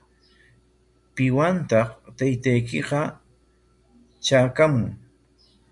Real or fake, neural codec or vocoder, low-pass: real; none; 10.8 kHz